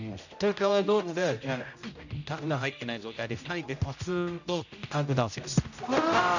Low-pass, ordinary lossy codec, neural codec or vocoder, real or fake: 7.2 kHz; none; codec, 16 kHz, 0.5 kbps, X-Codec, HuBERT features, trained on general audio; fake